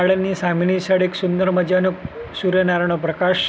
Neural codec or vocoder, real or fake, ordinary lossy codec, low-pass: none; real; none; none